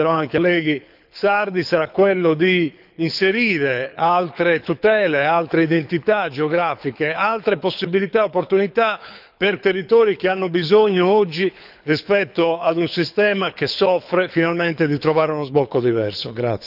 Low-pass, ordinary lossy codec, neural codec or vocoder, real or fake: 5.4 kHz; none; codec, 24 kHz, 6 kbps, HILCodec; fake